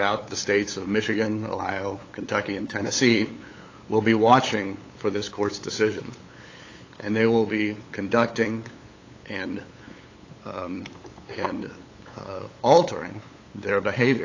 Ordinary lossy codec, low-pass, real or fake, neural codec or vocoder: AAC, 32 kbps; 7.2 kHz; fake; codec, 16 kHz, 8 kbps, FunCodec, trained on LibriTTS, 25 frames a second